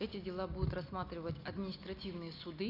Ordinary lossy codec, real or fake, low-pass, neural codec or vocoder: none; real; 5.4 kHz; none